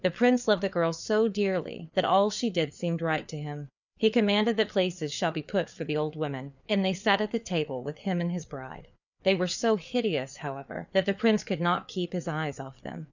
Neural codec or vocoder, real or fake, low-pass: codec, 16 kHz, 4 kbps, FreqCodec, larger model; fake; 7.2 kHz